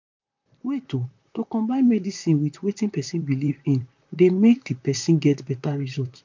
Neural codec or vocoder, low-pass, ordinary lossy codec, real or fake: vocoder, 44.1 kHz, 128 mel bands, Pupu-Vocoder; 7.2 kHz; MP3, 64 kbps; fake